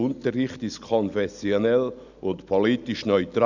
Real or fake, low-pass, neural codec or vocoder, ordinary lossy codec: real; 7.2 kHz; none; none